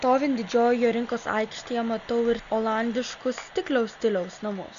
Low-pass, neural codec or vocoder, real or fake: 7.2 kHz; none; real